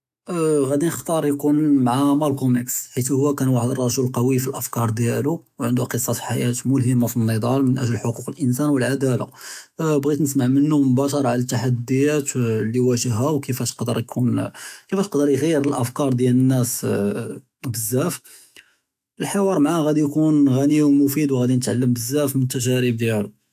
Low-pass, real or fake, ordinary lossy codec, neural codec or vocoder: 14.4 kHz; fake; AAC, 96 kbps; autoencoder, 48 kHz, 128 numbers a frame, DAC-VAE, trained on Japanese speech